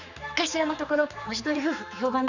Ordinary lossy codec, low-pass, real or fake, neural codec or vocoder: none; 7.2 kHz; fake; codec, 16 kHz, 4 kbps, X-Codec, HuBERT features, trained on general audio